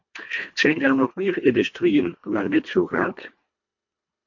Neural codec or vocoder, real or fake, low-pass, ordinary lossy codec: codec, 24 kHz, 1.5 kbps, HILCodec; fake; 7.2 kHz; MP3, 48 kbps